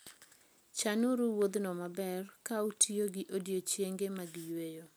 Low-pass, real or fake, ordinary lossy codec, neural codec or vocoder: none; real; none; none